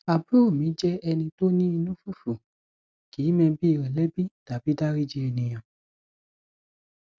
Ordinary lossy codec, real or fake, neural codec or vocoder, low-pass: none; real; none; none